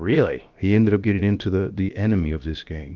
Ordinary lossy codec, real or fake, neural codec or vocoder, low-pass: Opus, 32 kbps; fake; codec, 16 kHz, about 1 kbps, DyCAST, with the encoder's durations; 7.2 kHz